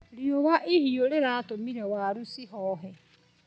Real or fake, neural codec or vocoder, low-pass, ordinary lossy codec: real; none; none; none